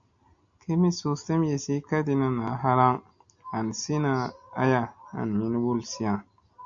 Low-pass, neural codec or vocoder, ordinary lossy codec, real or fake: 7.2 kHz; none; MP3, 64 kbps; real